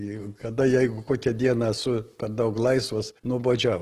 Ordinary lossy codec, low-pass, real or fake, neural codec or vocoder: Opus, 16 kbps; 14.4 kHz; real; none